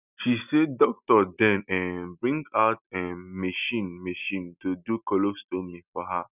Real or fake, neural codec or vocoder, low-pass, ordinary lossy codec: real; none; 3.6 kHz; none